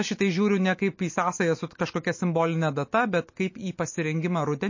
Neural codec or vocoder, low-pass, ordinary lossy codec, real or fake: none; 7.2 kHz; MP3, 32 kbps; real